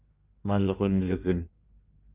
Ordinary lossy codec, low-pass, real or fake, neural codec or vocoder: Opus, 32 kbps; 3.6 kHz; fake; codec, 16 kHz in and 24 kHz out, 1.1 kbps, FireRedTTS-2 codec